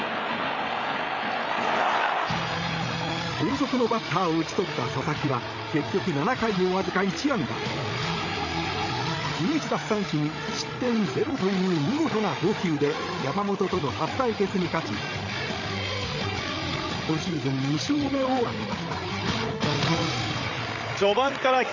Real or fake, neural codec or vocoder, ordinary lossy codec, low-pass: fake; codec, 16 kHz, 8 kbps, FreqCodec, larger model; none; 7.2 kHz